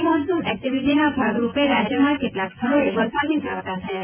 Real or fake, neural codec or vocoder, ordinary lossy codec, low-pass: fake; vocoder, 24 kHz, 100 mel bands, Vocos; none; 3.6 kHz